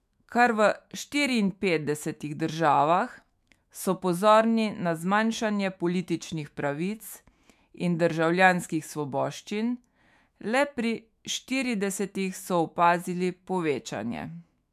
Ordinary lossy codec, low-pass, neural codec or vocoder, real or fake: MP3, 96 kbps; 14.4 kHz; autoencoder, 48 kHz, 128 numbers a frame, DAC-VAE, trained on Japanese speech; fake